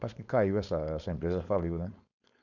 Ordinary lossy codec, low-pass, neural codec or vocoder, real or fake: none; 7.2 kHz; codec, 16 kHz, 4.8 kbps, FACodec; fake